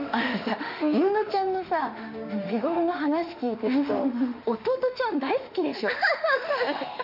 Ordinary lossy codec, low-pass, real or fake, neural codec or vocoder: none; 5.4 kHz; fake; autoencoder, 48 kHz, 32 numbers a frame, DAC-VAE, trained on Japanese speech